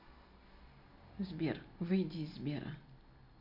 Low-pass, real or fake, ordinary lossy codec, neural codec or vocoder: 5.4 kHz; real; none; none